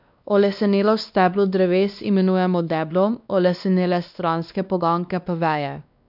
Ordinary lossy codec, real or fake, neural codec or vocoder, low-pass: none; fake; codec, 16 kHz, 2 kbps, X-Codec, WavLM features, trained on Multilingual LibriSpeech; 5.4 kHz